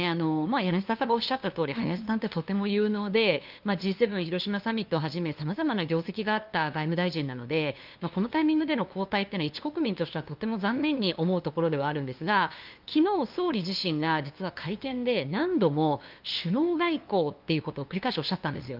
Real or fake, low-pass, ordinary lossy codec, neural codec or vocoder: fake; 5.4 kHz; Opus, 24 kbps; codec, 16 kHz, 2 kbps, FunCodec, trained on LibriTTS, 25 frames a second